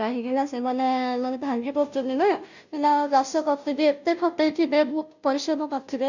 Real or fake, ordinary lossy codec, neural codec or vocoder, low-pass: fake; none; codec, 16 kHz, 0.5 kbps, FunCodec, trained on Chinese and English, 25 frames a second; 7.2 kHz